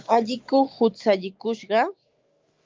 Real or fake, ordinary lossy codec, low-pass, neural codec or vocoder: fake; Opus, 32 kbps; 7.2 kHz; autoencoder, 48 kHz, 128 numbers a frame, DAC-VAE, trained on Japanese speech